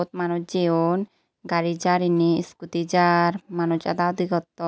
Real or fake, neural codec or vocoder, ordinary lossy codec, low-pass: real; none; none; none